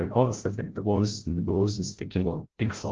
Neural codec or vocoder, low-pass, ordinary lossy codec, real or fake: codec, 16 kHz, 0.5 kbps, FreqCodec, larger model; 7.2 kHz; Opus, 16 kbps; fake